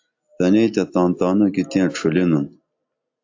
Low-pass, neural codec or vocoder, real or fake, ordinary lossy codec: 7.2 kHz; none; real; AAC, 48 kbps